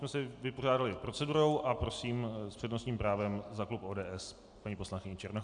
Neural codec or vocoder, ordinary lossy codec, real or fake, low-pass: none; AAC, 64 kbps; real; 9.9 kHz